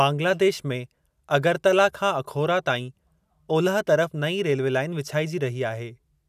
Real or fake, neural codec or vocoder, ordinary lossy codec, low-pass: real; none; none; 14.4 kHz